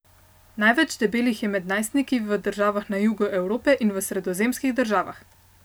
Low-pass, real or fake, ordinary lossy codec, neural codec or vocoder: none; real; none; none